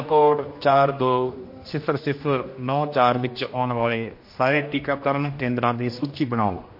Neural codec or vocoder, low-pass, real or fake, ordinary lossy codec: codec, 16 kHz, 1 kbps, X-Codec, HuBERT features, trained on general audio; 5.4 kHz; fake; MP3, 32 kbps